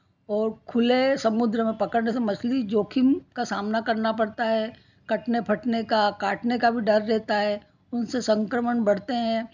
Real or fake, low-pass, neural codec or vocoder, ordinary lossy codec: real; 7.2 kHz; none; none